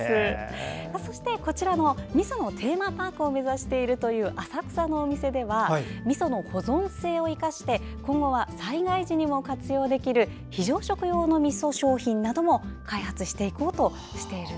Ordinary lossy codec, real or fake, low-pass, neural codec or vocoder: none; real; none; none